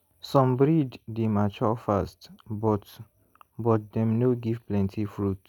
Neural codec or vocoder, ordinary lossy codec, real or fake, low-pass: none; none; real; 19.8 kHz